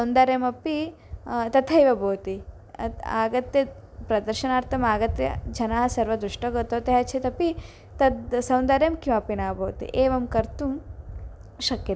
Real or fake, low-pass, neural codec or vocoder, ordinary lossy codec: real; none; none; none